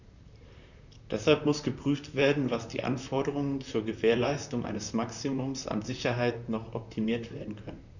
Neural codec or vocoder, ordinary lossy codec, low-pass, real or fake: vocoder, 44.1 kHz, 128 mel bands, Pupu-Vocoder; none; 7.2 kHz; fake